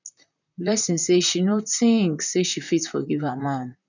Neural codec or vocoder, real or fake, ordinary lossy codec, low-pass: vocoder, 44.1 kHz, 128 mel bands, Pupu-Vocoder; fake; none; 7.2 kHz